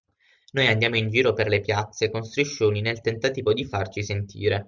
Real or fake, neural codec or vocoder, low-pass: real; none; 7.2 kHz